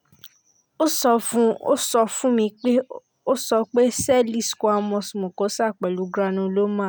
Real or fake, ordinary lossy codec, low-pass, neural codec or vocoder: real; none; none; none